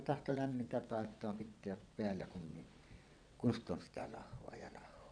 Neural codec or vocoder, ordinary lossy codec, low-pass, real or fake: vocoder, 22.05 kHz, 80 mel bands, WaveNeXt; none; 9.9 kHz; fake